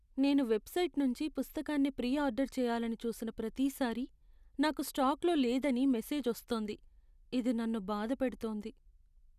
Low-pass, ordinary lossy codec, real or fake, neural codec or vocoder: 14.4 kHz; none; real; none